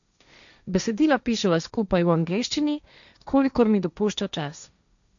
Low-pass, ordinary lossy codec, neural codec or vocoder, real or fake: 7.2 kHz; none; codec, 16 kHz, 1.1 kbps, Voila-Tokenizer; fake